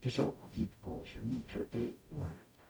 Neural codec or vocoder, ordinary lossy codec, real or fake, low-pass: codec, 44.1 kHz, 0.9 kbps, DAC; none; fake; none